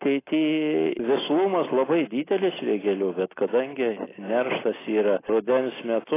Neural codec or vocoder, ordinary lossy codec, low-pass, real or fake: none; AAC, 16 kbps; 3.6 kHz; real